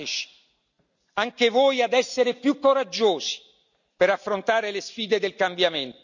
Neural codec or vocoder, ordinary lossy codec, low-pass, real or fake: none; none; 7.2 kHz; real